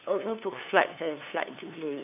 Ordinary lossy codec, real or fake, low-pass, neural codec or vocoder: none; fake; 3.6 kHz; codec, 16 kHz, 2 kbps, FunCodec, trained on LibriTTS, 25 frames a second